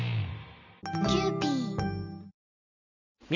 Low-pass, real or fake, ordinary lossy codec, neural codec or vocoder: 7.2 kHz; real; none; none